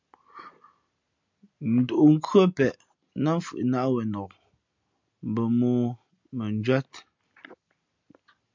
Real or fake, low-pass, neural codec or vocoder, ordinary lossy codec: real; 7.2 kHz; none; MP3, 64 kbps